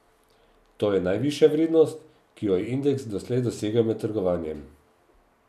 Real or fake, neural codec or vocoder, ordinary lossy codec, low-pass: real; none; none; 14.4 kHz